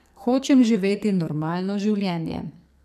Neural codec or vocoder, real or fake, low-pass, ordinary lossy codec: codec, 44.1 kHz, 2.6 kbps, SNAC; fake; 14.4 kHz; none